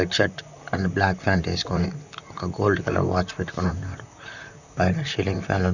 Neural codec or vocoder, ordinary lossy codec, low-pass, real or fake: vocoder, 44.1 kHz, 128 mel bands, Pupu-Vocoder; none; 7.2 kHz; fake